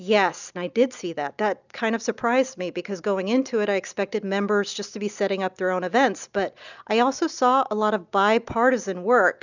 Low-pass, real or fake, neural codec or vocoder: 7.2 kHz; real; none